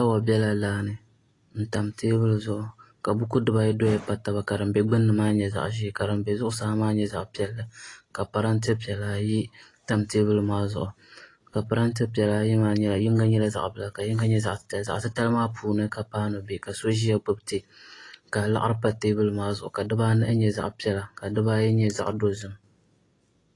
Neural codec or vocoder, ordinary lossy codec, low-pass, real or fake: none; AAC, 32 kbps; 10.8 kHz; real